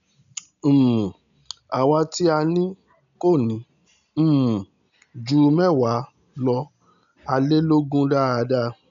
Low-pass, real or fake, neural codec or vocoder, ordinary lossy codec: 7.2 kHz; real; none; none